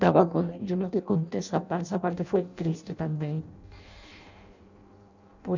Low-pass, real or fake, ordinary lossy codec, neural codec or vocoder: 7.2 kHz; fake; none; codec, 16 kHz in and 24 kHz out, 0.6 kbps, FireRedTTS-2 codec